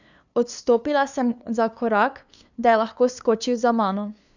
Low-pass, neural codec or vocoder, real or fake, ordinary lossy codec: 7.2 kHz; codec, 16 kHz, 2 kbps, FunCodec, trained on LibriTTS, 25 frames a second; fake; none